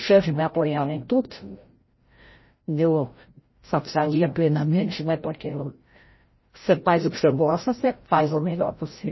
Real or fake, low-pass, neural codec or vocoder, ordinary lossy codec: fake; 7.2 kHz; codec, 16 kHz, 0.5 kbps, FreqCodec, larger model; MP3, 24 kbps